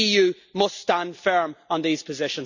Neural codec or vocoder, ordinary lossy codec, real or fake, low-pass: none; none; real; 7.2 kHz